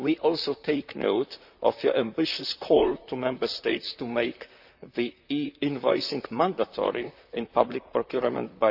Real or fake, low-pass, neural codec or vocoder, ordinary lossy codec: fake; 5.4 kHz; vocoder, 44.1 kHz, 128 mel bands, Pupu-Vocoder; AAC, 48 kbps